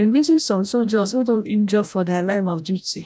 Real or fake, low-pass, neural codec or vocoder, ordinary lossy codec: fake; none; codec, 16 kHz, 0.5 kbps, FreqCodec, larger model; none